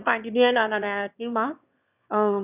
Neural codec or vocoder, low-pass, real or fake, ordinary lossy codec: autoencoder, 22.05 kHz, a latent of 192 numbers a frame, VITS, trained on one speaker; 3.6 kHz; fake; none